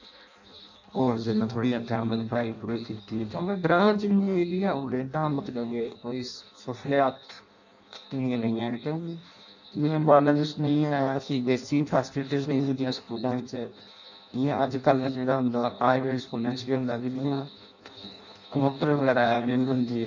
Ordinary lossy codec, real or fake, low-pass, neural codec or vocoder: none; fake; 7.2 kHz; codec, 16 kHz in and 24 kHz out, 0.6 kbps, FireRedTTS-2 codec